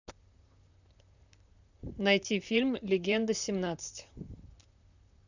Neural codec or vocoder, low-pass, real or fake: vocoder, 44.1 kHz, 128 mel bands, Pupu-Vocoder; 7.2 kHz; fake